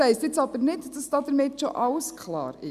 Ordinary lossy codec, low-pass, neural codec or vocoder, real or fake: Opus, 64 kbps; 14.4 kHz; none; real